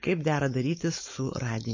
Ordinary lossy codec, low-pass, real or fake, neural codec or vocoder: MP3, 32 kbps; 7.2 kHz; fake; codec, 16 kHz, 4.8 kbps, FACodec